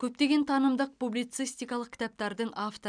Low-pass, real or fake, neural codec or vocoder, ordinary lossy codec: 9.9 kHz; real; none; none